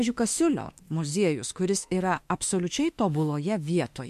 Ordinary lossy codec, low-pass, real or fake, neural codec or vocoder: MP3, 64 kbps; 14.4 kHz; fake; autoencoder, 48 kHz, 32 numbers a frame, DAC-VAE, trained on Japanese speech